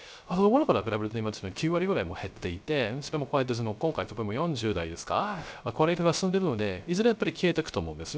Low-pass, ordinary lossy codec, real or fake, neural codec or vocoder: none; none; fake; codec, 16 kHz, 0.3 kbps, FocalCodec